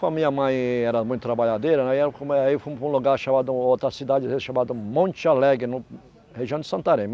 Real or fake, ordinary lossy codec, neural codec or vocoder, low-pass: real; none; none; none